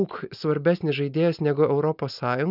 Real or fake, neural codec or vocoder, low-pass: real; none; 5.4 kHz